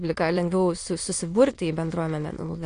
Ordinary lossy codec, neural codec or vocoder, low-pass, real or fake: AAC, 48 kbps; autoencoder, 22.05 kHz, a latent of 192 numbers a frame, VITS, trained on many speakers; 9.9 kHz; fake